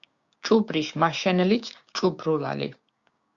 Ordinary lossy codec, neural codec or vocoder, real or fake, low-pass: Opus, 64 kbps; codec, 16 kHz, 6 kbps, DAC; fake; 7.2 kHz